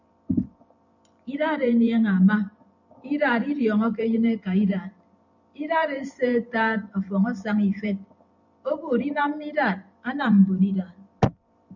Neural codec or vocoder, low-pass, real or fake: vocoder, 44.1 kHz, 128 mel bands every 512 samples, BigVGAN v2; 7.2 kHz; fake